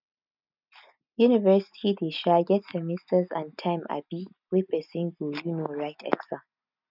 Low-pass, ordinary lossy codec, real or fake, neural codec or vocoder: 5.4 kHz; none; real; none